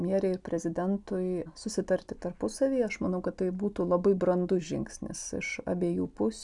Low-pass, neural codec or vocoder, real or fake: 10.8 kHz; none; real